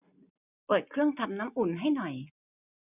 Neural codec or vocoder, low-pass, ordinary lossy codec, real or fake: none; 3.6 kHz; none; real